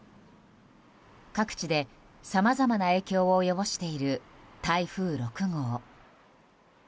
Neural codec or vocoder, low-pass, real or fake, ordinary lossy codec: none; none; real; none